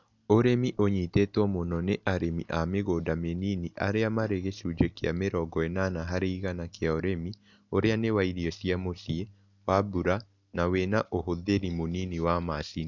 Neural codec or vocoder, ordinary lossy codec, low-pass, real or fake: none; none; 7.2 kHz; real